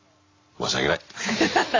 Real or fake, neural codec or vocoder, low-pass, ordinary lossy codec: real; none; 7.2 kHz; AAC, 32 kbps